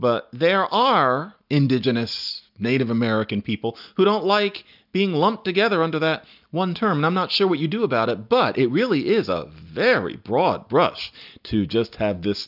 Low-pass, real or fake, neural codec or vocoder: 5.4 kHz; real; none